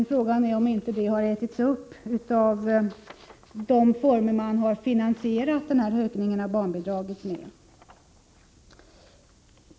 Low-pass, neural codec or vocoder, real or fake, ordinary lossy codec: none; none; real; none